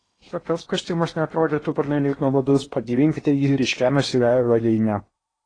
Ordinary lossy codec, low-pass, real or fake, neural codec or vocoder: AAC, 32 kbps; 9.9 kHz; fake; codec, 16 kHz in and 24 kHz out, 0.8 kbps, FocalCodec, streaming, 65536 codes